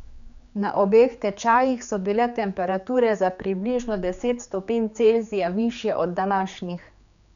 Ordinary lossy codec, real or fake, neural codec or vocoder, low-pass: none; fake; codec, 16 kHz, 4 kbps, X-Codec, HuBERT features, trained on general audio; 7.2 kHz